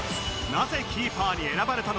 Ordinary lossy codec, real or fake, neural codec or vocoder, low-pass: none; real; none; none